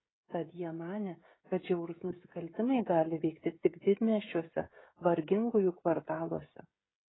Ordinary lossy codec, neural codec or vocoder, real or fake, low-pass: AAC, 16 kbps; codec, 16 kHz, 16 kbps, FreqCodec, smaller model; fake; 7.2 kHz